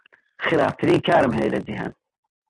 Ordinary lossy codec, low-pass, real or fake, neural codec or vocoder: Opus, 32 kbps; 10.8 kHz; real; none